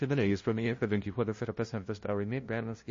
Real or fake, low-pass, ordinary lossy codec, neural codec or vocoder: fake; 7.2 kHz; MP3, 32 kbps; codec, 16 kHz, 0.5 kbps, FunCodec, trained on LibriTTS, 25 frames a second